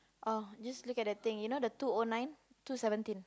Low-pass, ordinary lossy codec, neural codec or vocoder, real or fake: none; none; none; real